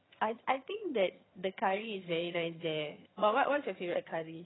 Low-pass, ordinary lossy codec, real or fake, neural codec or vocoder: 7.2 kHz; AAC, 16 kbps; fake; vocoder, 22.05 kHz, 80 mel bands, HiFi-GAN